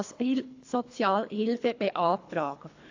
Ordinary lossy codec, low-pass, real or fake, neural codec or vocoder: AAC, 48 kbps; 7.2 kHz; fake; codec, 24 kHz, 3 kbps, HILCodec